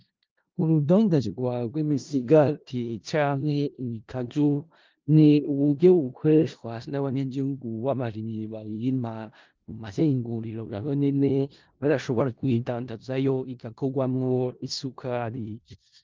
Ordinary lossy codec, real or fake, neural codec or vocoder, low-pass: Opus, 32 kbps; fake; codec, 16 kHz in and 24 kHz out, 0.4 kbps, LongCat-Audio-Codec, four codebook decoder; 7.2 kHz